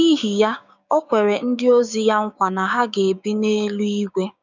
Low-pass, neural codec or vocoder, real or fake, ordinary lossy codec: 7.2 kHz; codec, 16 kHz, 6 kbps, DAC; fake; none